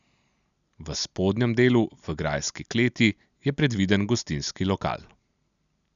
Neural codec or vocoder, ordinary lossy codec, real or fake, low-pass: none; none; real; 7.2 kHz